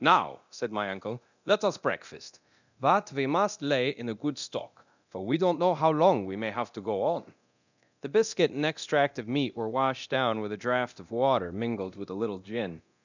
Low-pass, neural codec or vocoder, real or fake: 7.2 kHz; codec, 24 kHz, 0.9 kbps, DualCodec; fake